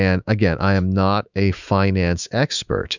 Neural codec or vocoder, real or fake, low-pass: autoencoder, 48 kHz, 128 numbers a frame, DAC-VAE, trained on Japanese speech; fake; 7.2 kHz